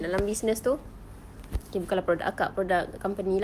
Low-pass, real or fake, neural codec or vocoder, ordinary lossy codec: 14.4 kHz; real; none; Opus, 32 kbps